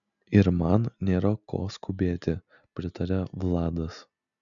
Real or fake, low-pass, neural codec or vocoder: real; 7.2 kHz; none